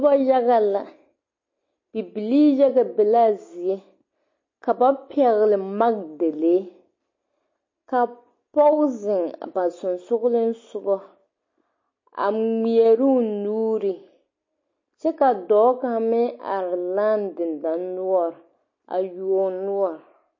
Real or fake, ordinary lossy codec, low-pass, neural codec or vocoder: real; MP3, 32 kbps; 7.2 kHz; none